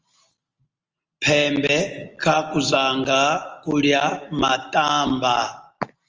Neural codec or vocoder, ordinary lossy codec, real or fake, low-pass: none; Opus, 24 kbps; real; 7.2 kHz